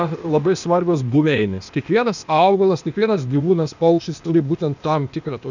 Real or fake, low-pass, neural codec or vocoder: fake; 7.2 kHz; codec, 16 kHz, 0.8 kbps, ZipCodec